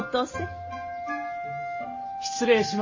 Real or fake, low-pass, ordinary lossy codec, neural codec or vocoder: real; 7.2 kHz; MP3, 32 kbps; none